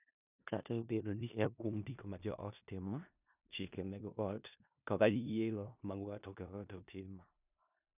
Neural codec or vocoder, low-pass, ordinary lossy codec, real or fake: codec, 16 kHz in and 24 kHz out, 0.4 kbps, LongCat-Audio-Codec, four codebook decoder; 3.6 kHz; none; fake